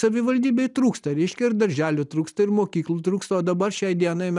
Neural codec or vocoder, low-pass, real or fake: none; 10.8 kHz; real